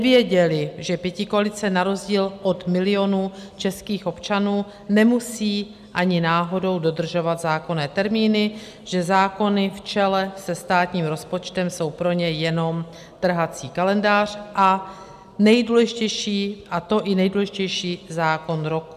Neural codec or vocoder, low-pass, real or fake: none; 14.4 kHz; real